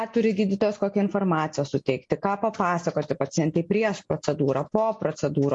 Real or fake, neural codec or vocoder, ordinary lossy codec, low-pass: real; none; MP3, 48 kbps; 10.8 kHz